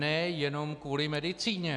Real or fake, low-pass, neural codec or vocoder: real; 10.8 kHz; none